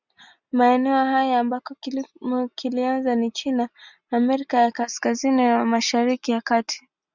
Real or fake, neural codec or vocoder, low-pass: real; none; 7.2 kHz